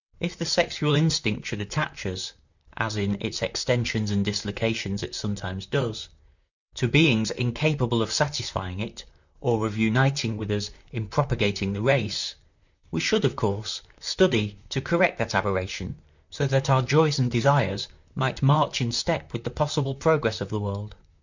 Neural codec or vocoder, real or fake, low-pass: vocoder, 44.1 kHz, 128 mel bands, Pupu-Vocoder; fake; 7.2 kHz